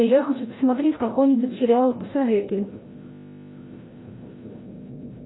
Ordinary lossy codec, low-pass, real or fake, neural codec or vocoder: AAC, 16 kbps; 7.2 kHz; fake; codec, 16 kHz, 0.5 kbps, FreqCodec, larger model